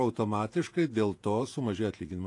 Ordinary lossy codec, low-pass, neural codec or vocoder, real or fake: AAC, 48 kbps; 10.8 kHz; vocoder, 48 kHz, 128 mel bands, Vocos; fake